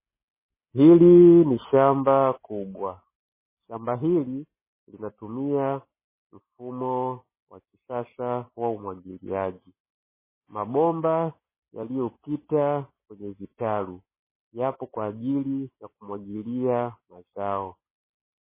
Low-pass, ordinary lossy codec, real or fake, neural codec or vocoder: 3.6 kHz; MP3, 16 kbps; real; none